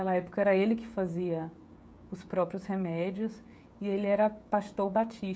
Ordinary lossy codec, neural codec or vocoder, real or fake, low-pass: none; codec, 16 kHz, 16 kbps, FreqCodec, smaller model; fake; none